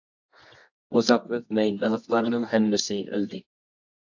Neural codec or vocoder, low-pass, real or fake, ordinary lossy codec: codec, 24 kHz, 0.9 kbps, WavTokenizer, medium music audio release; 7.2 kHz; fake; AAC, 48 kbps